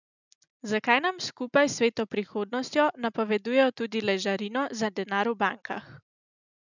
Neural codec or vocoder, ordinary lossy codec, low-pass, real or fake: none; none; 7.2 kHz; real